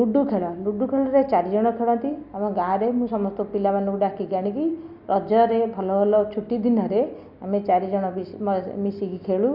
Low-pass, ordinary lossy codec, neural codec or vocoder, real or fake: 5.4 kHz; Opus, 64 kbps; none; real